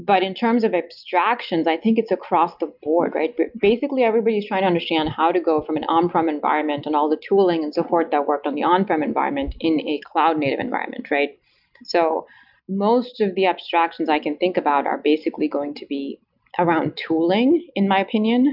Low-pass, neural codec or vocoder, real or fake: 5.4 kHz; none; real